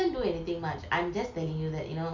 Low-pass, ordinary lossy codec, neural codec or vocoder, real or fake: 7.2 kHz; MP3, 64 kbps; none; real